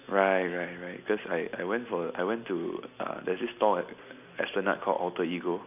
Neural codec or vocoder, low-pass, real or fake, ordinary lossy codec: none; 3.6 kHz; real; none